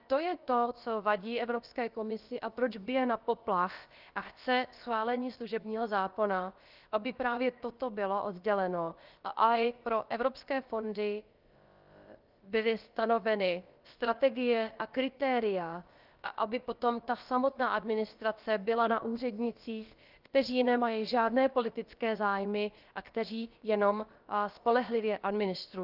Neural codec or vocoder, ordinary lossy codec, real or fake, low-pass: codec, 16 kHz, about 1 kbps, DyCAST, with the encoder's durations; Opus, 32 kbps; fake; 5.4 kHz